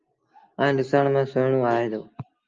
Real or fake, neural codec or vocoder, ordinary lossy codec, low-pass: real; none; Opus, 24 kbps; 7.2 kHz